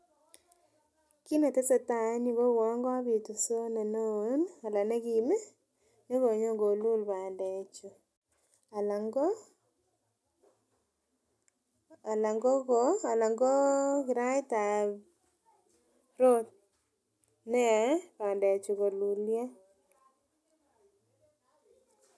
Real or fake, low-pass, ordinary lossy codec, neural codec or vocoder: real; none; none; none